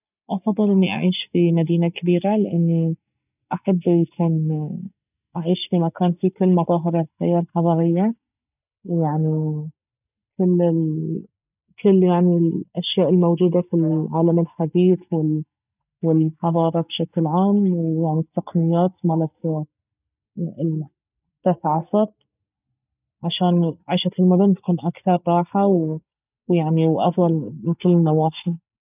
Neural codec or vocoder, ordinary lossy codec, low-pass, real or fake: none; none; 3.6 kHz; real